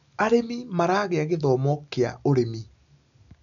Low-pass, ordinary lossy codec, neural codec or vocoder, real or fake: 7.2 kHz; none; none; real